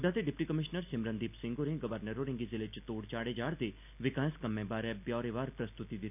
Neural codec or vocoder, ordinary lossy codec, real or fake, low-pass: none; none; real; 3.6 kHz